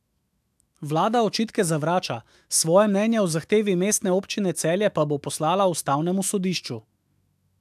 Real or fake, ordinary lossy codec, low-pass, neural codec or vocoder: fake; AAC, 96 kbps; 14.4 kHz; autoencoder, 48 kHz, 128 numbers a frame, DAC-VAE, trained on Japanese speech